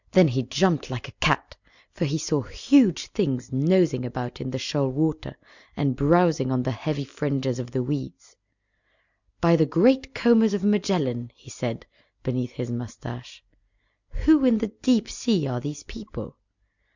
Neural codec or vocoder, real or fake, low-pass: none; real; 7.2 kHz